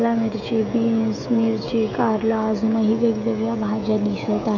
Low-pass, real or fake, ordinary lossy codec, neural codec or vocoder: 7.2 kHz; fake; AAC, 48 kbps; codec, 16 kHz, 16 kbps, FreqCodec, smaller model